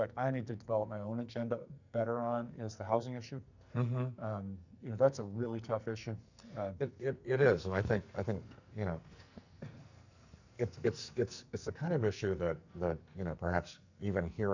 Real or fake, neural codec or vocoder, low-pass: fake; codec, 44.1 kHz, 2.6 kbps, SNAC; 7.2 kHz